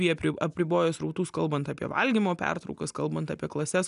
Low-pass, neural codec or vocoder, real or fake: 10.8 kHz; none; real